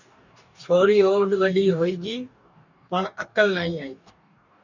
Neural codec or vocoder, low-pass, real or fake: codec, 44.1 kHz, 2.6 kbps, DAC; 7.2 kHz; fake